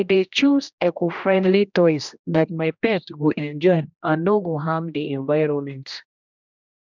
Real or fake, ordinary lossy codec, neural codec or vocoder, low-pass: fake; none; codec, 16 kHz, 1 kbps, X-Codec, HuBERT features, trained on general audio; 7.2 kHz